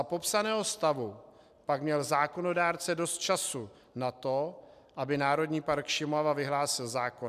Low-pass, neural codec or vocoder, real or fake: 14.4 kHz; none; real